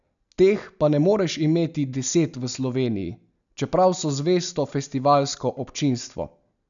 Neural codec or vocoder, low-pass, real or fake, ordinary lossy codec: none; 7.2 kHz; real; none